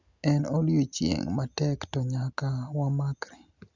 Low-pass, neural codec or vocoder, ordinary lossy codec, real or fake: 7.2 kHz; none; Opus, 64 kbps; real